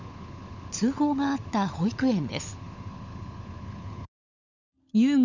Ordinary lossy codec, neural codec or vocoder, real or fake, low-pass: none; codec, 16 kHz, 16 kbps, FunCodec, trained on LibriTTS, 50 frames a second; fake; 7.2 kHz